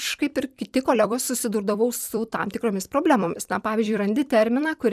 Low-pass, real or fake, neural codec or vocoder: 14.4 kHz; fake; vocoder, 44.1 kHz, 128 mel bands every 256 samples, BigVGAN v2